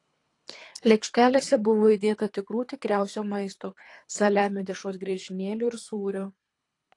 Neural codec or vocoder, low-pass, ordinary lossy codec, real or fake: codec, 24 kHz, 3 kbps, HILCodec; 10.8 kHz; AAC, 48 kbps; fake